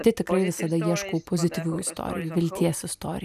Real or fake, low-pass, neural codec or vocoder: real; 14.4 kHz; none